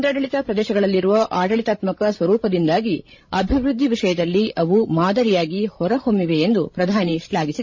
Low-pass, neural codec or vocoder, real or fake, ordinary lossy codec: 7.2 kHz; codec, 16 kHz, 16 kbps, FreqCodec, larger model; fake; MP3, 32 kbps